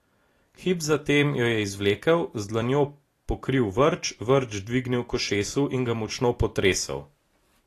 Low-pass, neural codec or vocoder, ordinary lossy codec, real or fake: 14.4 kHz; none; AAC, 48 kbps; real